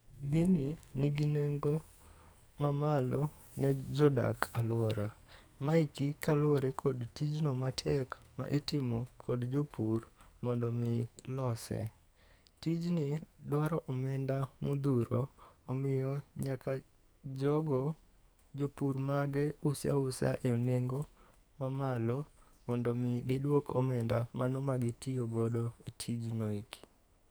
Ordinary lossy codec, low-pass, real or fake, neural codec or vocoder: none; none; fake; codec, 44.1 kHz, 2.6 kbps, SNAC